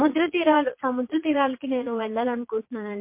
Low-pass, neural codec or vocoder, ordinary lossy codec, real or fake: 3.6 kHz; vocoder, 22.05 kHz, 80 mel bands, Vocos; MP3, 24 kbps; fake